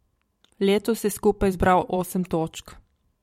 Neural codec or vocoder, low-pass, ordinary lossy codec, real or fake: vocoder, 44.1 kHz, 128 mel bands every 512 samples, BigVGAN v2; 19.8 kHz; MP3, 64 kbps; fake